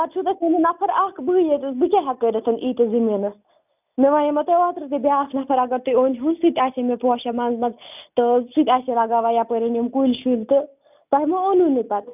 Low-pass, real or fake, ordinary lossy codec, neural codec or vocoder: 3.6 kHz; real; none; none